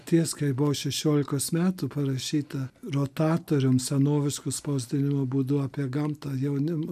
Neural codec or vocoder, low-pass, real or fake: none; 14.4 kHz; real